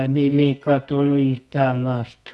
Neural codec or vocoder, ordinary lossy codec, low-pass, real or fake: codec, 24 kHz, 0.9 kbps, WavTokenizer, medium music audio release; none; none; fake